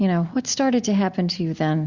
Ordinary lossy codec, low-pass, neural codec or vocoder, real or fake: Opus, 64 kbps; 7.2 kHz; none; real